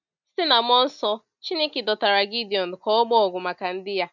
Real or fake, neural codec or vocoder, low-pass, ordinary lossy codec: real; none; 7.2 kHz; none